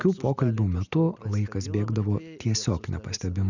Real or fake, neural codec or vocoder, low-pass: real; none; 7.2 kHz